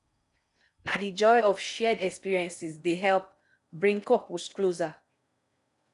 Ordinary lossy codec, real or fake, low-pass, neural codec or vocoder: none; fake; 10.8 kHz; codec, 16 kHz in and 24 kHz out, 0.6 kbps, FocalCodec, streaming, 4096 codes